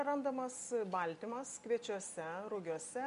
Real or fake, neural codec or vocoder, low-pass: real; none; 10.8 kHz